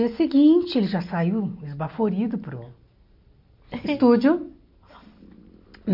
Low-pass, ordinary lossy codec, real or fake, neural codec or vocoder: 5.4 kHz; MP3, 48 kbps; real; none